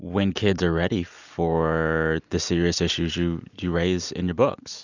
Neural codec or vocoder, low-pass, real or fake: none; 7.2 kHz; real